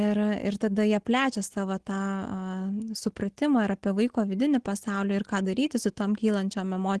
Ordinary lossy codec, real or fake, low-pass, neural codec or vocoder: Opus, 16 kbps; real; 10.8 kHz; none